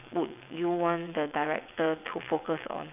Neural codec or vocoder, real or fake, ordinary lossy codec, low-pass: vocoder, 22.05 kHz, 80 mel bands, WaveNeXt; fake; none; 3.6 kHz